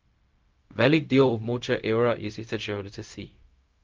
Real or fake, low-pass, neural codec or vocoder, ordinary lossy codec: fake; 7.2 kHz; codec, 16 kHz, 0.4 kbps, LongCat-Audio-Codec; Opus, 24 kbps